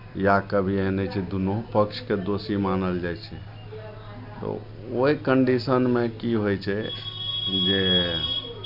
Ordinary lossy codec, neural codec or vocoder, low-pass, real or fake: none; none; 5.4 kHz; real